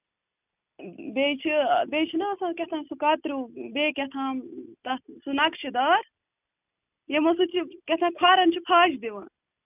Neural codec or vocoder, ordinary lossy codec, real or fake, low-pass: none; none; real; 3.6 kHz